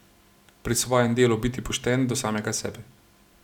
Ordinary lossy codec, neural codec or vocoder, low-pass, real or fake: none; none; 19.8 kHz; real